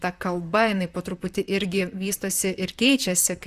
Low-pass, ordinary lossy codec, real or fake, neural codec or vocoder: 14.4 kHz; Opus, 64 kbps; fake; vocoder, 44.1 kHz, 128 mel bands, Pupu-Vocoder